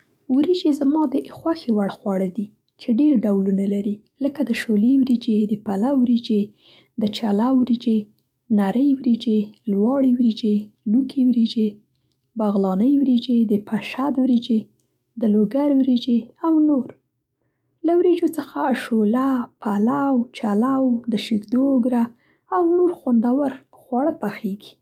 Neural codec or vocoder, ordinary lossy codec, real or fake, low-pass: codec, 44.1 kHz, 7.8 kbps, DAC; MP3, 96 kbps; fake; 19.8 kHz